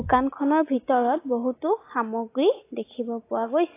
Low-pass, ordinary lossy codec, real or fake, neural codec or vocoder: 3.6 kHz; AAC, 24 kbps; real; none